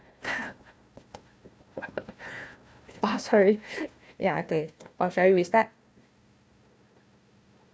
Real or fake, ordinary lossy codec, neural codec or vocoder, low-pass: fake; none; codec, 16 kHz, 1 kbps, FunCodec, trained on Chinese and English, 50 frames a second; none